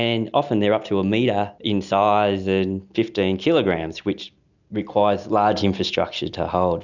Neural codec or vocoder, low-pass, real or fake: none; 7.2 kHz; real